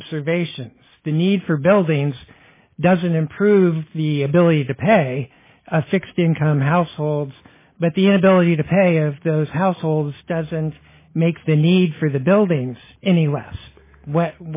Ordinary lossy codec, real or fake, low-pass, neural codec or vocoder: MP3, 16 kbps; real; 3.6 kHz; none